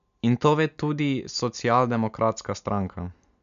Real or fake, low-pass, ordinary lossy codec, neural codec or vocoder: real; 7.2 kHz; MP3, 64 kbps; none